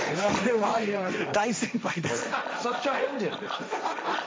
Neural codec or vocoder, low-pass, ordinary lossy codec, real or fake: codec, 16 kHz, 1.1 kbps, Voila-Tokenizer; none; none; fake